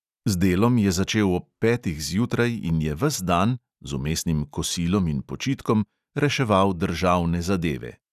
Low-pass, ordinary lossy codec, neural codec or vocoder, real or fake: 14.4 kHz; none; none; real